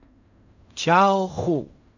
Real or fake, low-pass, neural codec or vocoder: fake; 7.2 kHz; codec, 16 kHz in and 24 kHz out, 0.4 kbps, LongCat-Audio-Codec, fine tuned four codebook decoder